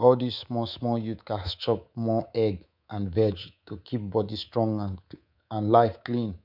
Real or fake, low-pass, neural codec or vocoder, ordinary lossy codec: fake; 5.4 kHz; codec, 24 kHz, 3.1 kbps, DualCodec; none